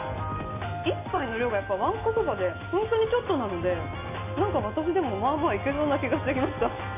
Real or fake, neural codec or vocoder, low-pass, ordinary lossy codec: real; none; 3.6 kHz; MP3, 24 kbps